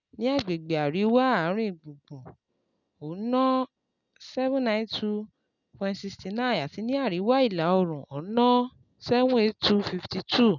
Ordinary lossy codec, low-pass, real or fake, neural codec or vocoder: none; 7.2 kHz; real; none